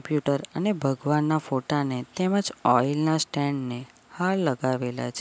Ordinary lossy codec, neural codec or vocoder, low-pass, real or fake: none; none; none; real